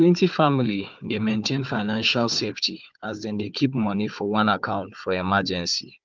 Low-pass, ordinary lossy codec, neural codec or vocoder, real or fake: 7.2 kHz; Opus, 24 kbps; codec, 16 kHz, 4 kbps, FunCodec, trained on Chinese and English, 50 frames a second; fake